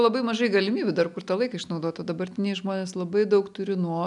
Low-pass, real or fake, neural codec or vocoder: 10.8 kHz; real; none